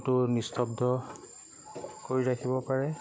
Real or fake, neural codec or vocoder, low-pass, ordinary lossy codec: real; none; none; none